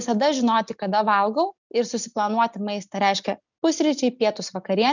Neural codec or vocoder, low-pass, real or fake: none; 7.2 kHz; real